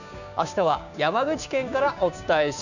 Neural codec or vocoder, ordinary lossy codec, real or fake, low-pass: codec, 16 kHz, 6 kbps, DAC; none; fake; 7.2 kHz